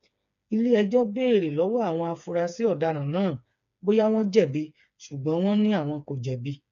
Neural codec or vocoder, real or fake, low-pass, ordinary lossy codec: codec, 16 kHz, 4 kbps, FreqCodec, smaller model; fake; 7.2 kHz; none